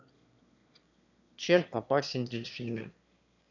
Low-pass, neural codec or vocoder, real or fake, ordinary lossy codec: 7.2 kHz; autoencoder, 22.05 kHz, a latent of 192 numbers a frame, VITS, trained on one speaker; fake; none